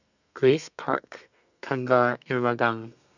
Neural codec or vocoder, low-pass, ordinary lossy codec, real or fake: codec, 32 kHz, 1.9 kbps, SNAC; 7.2 kHz; none; fake